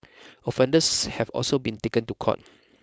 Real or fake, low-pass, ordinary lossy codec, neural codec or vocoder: fake; none; none; codec, 16 kHz, 4.8 kbps, FACodec